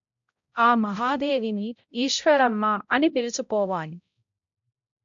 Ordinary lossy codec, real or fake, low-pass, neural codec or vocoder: AAC, 64 kbps; fake; 7.2 kHz; codec, 16 kHz, 0.5 kbps, X-Codec, HuBERT features, trained on balanced general audio